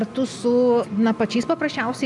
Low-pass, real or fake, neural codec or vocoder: 10.8 kHz; real; none